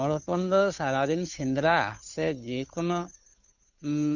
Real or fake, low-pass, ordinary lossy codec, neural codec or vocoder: fake; 7.2 kHz; none; codec, 16 kHz, 2 kbps, FunCodec, trained on Chinese and English, 25 frames a second